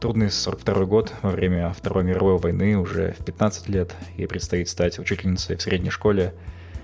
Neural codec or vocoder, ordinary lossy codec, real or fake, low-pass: none; none; real; none